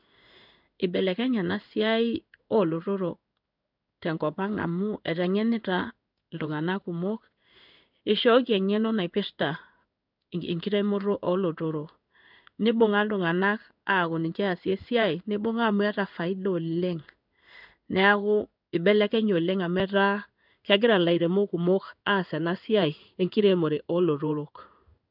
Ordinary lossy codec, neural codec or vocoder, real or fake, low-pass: none; codec, 16 kHz in and 24 kHz out, 1 kbps, XY-Tokenizer; fake; 5.4 kHz